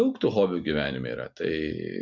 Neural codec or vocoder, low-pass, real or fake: none; 7.2 kHz; real